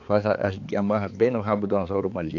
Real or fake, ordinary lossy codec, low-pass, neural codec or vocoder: fake; AAC, 48 kbps; 7.2 kHz; codec, 16 kHz, 4 kbps, X-Codec, HuBERT features, trained on balanced general audio